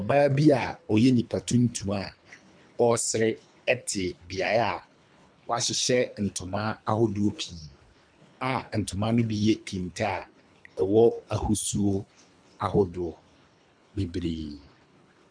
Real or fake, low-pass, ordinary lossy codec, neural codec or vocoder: fake; 9.9 kHz; MP3, 96 kbps; codec, 24 kHz, 3 kbps, HILCodec